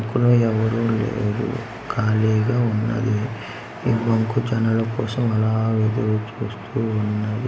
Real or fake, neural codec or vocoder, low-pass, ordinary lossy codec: real; none; none; none